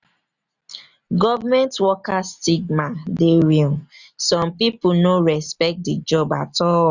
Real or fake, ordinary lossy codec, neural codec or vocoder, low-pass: real; none; none; 7.2 kHz